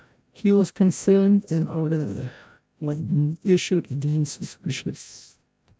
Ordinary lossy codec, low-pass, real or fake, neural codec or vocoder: none; none; fake; codec, 16 kHz, 0.5 kbps, FreqCodec, larger model